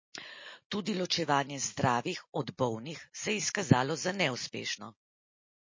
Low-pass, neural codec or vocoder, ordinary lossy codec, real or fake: 7.2 kHz; none; MP3, 32 kbps; real